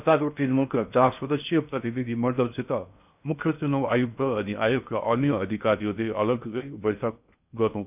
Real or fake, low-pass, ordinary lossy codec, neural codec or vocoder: fake; 3.6 kHz; none; codec, 16 kHz in and 24 kHz out, 0.6 kbps, FocalCodec, streaming, 4096 codes